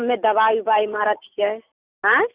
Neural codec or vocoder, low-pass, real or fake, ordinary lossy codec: none; 3.6 kHz; real; Opus, 64 kbps